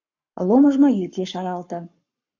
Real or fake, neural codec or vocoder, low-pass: fake; codec, 44.1 kHz, 7.8 kbps, Pupu-Codec; 7.2 kHz